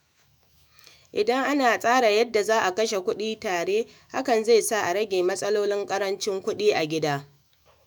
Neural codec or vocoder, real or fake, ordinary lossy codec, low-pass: autoencoder, 48 kHz, 128 numbers a frame, DAC-VAE, trained on Japanese speech; fake; none; none